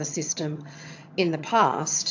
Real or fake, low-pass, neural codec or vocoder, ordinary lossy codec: fake; 7.2 kHz; vocoder, 22.05 kHz, 80 mel bands, HiFi-GAN; AAC, 48 kbps